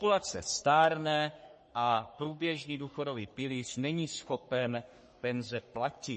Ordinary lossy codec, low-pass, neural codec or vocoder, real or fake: MP3, 32 kbps; 10.8 kHz; codec, 44.1 kHz, 3.4 kbps, Pupu-Codec; fake